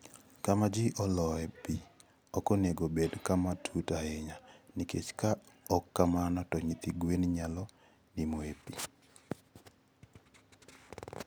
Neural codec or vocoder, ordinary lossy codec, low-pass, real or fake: none; none; none; real